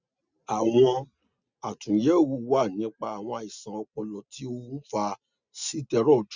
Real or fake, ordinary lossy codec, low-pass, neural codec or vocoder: real; Opus, 64 kbps; 7.2 kHz; none